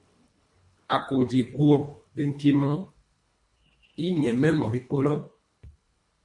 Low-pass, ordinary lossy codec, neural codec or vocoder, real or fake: 10.8 kHz; MP3, 48 kbps; codec, 24 kHz, 1.5 kbps, HILCodec; fake